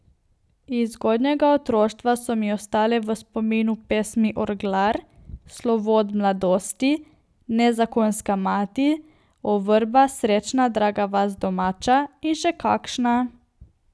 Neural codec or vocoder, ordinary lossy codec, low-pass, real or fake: none; none; none; real